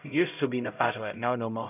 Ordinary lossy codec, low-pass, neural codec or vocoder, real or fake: none; 3.6 kHz; codec, 16 kHz, 0.5 kbps, X-Codec, HuBERT features, trained on LibriSpeech; fake